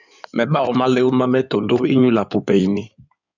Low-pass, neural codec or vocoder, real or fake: 7.2 kHz; codec, 16 kHz in and 24 kHz out, 2.2 kbps, FireRedTTS-2 codec; fake